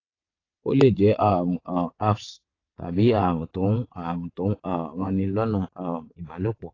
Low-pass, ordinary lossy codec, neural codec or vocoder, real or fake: 7.2 kHz; AAC, 32 kbps; vocoder, 22.05 kHz, 80 mel bands, WaveNeXt; fake